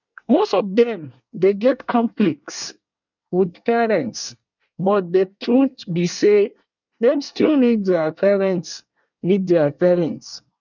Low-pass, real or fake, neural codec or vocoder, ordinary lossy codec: 7.2 kHz; fake; codec, 24 kHz, 1 kbps, SNAC; none